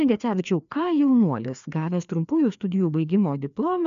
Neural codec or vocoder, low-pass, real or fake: codec, 16 kHz, 2 kbps, FreqCodec, larger model; 7.2 kHz; fake